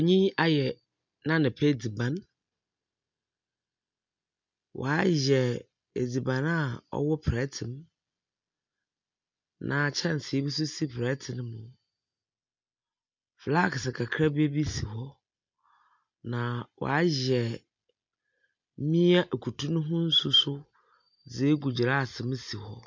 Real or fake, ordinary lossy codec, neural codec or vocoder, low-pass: real; MP3, 64 kbps; none; 7.2 kHz